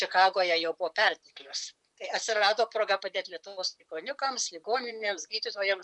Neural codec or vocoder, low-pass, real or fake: vocoder, 44.1 kHz, 128 mel bands, Pupu-Vocoder; 10.8 kHz; fake